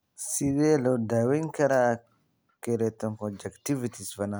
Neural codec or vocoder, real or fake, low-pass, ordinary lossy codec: none; real; none; none